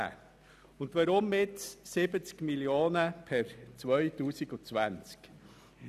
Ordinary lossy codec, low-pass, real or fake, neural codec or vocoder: none; 14.4 kHz; real; none